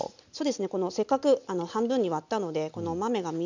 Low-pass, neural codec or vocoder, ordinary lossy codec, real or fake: 7.2 kHz; none; none; real